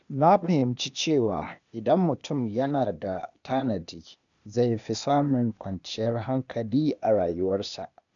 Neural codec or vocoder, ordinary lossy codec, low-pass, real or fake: codec, 16 kHz, 0.8 kbps, ZipCodec; none; 7.2 kHz; fake